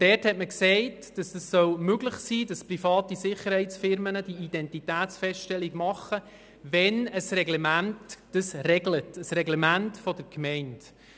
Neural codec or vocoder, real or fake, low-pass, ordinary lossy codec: none; real; none; none